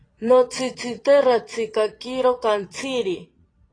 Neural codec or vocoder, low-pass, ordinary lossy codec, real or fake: vocoder, 22.05 kHz, 80 mel bands, Vocos; 9.9 kHz; AAC, 32 kbps; fake